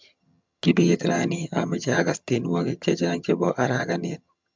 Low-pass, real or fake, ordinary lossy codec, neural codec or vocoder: 7.2 kHz; fake; MP3, 64 kbps; vocoder, 22.05 kHz, 80 mel bands, HiFi-GAN